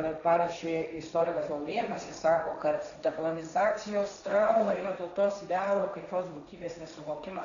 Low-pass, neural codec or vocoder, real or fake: 7.2 kHz; codec, 16 kHz, 1.1 kbps, Voila-Tokenizer; fake